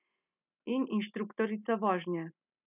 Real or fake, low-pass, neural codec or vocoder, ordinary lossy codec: real; 3.6 kHz; none; none